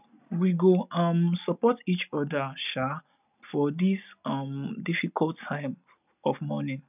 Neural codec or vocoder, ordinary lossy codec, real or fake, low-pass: none; none; real; 3.6 kHz